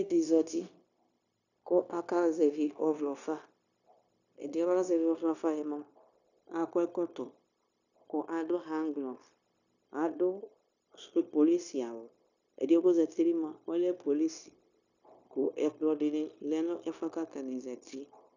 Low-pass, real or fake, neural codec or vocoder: 7.2 kHz; fake; codec, 16 kHz, 0.9 kbps, LongCat-Audio-Codec